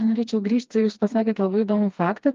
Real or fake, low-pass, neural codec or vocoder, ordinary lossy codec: fake; 7.2 kHz; codec, 16 kHz, 2 kbps, FreqCodec, smaller model; Opus, 32 kbps